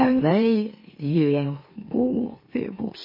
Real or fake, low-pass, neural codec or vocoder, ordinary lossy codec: fake; 5.4 kHz; autoencoder, 44.1 kHz, a latent of 192 numbers a frame, MeloTTS; MP3, 24 kbps